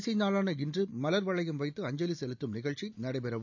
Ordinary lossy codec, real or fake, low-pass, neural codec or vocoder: none; real; 7.2 kHz; none